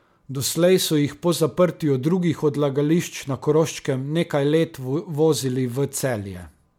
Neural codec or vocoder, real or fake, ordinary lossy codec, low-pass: none; real; MP3, 96 kbps; 19.8 kHz